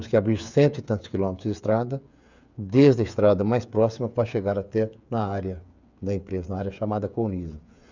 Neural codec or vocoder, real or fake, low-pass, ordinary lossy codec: codec, 16 kHz, 8 kbps, FreqCodec, smaller model; fake; 7.2 kHz; none